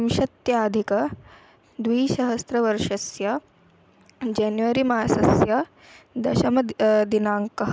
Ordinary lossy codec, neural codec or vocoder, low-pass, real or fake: none; none; none; real